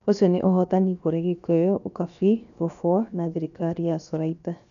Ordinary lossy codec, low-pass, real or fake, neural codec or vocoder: none; 7.2 kHz; fake; codec, 16 kHz, 0.7 kbps, FocalCodec